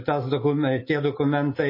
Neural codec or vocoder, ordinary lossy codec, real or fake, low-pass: none; MP3, 24 kbps; real; 5.4 kHz